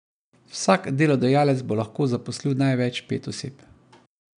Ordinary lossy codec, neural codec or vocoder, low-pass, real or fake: none; none; 9.9 kHz; real